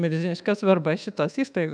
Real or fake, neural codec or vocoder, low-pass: fake; codec, 24 kHz, 1.2 kbps, DualCodec; 9.9 kHz